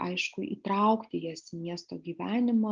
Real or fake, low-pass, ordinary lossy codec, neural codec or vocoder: real; 7.2 kHz; Opus, 24 kbps; none